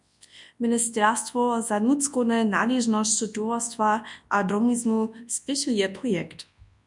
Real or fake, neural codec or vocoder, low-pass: fake; codec, 24 kHz, 0.9 kbps, WavTokenizer, large speech release; 10.8 kHz